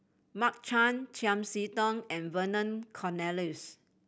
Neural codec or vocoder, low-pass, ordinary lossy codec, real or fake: none; none; none; real